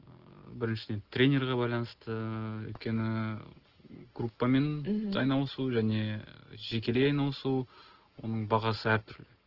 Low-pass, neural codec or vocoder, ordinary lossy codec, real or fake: 5.4 kHz; none; Opus, 16 kbps; real